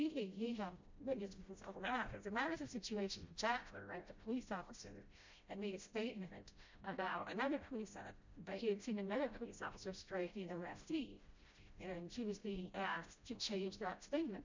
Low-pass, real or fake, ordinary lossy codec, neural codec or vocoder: 7.2 kHz; fake; MP3, 64 kbps; codec, 16 kHz, 0.5 kbps, FreqCodec, smaller model